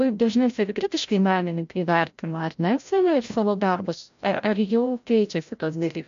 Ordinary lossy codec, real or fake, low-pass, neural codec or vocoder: MP3, 64 kbps; fake; 7.2 kHz; codec, 16 kHz, 0.5 kbps, FreqCodec, larger model